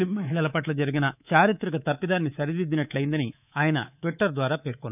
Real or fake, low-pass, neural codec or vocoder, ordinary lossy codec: fake; 3.6 kHz; codec, 24 kHz, 3.1 kbps, DualCodec; none